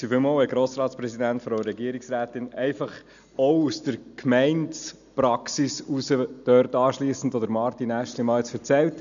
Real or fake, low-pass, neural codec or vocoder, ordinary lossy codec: real; 7.2 kHz; none; none